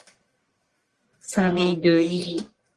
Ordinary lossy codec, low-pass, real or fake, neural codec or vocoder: Opus, 24 kbps; 10.8 kHz; fake; codec, 44.1 kHz, 1.7 kbps, Pupu-Codec